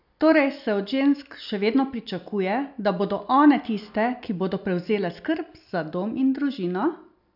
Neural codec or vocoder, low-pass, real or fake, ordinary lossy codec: none; 5.4 kHz; real; none